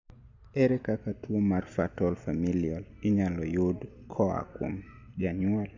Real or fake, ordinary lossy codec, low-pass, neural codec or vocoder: real; MP3, 64 kbps; 7.2 kHz; none